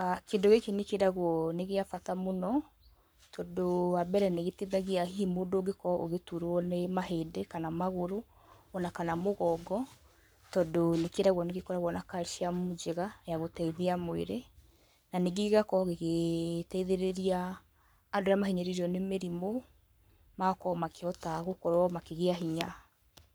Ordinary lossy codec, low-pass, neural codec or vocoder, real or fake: none; none; codec, 44.1 kHz, 7.8 kbps, Pupu-Codec; fake